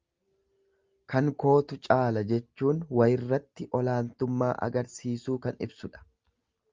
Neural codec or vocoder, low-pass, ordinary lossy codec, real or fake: none; 7.2 kHz; Opus, 24 kbps; real